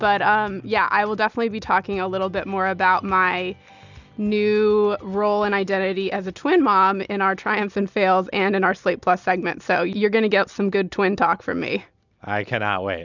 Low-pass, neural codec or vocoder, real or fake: 7.2 kHz; none; real